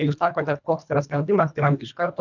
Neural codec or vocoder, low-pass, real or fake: codec, 24 kHz, 1.5 kbps, HILCodec; 7.2 kHz; fake